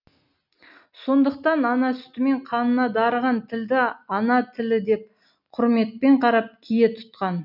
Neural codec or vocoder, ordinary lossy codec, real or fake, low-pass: none; none; real; 5.4 kHz